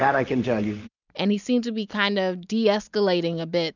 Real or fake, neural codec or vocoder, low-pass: real; none; 7.2 kHz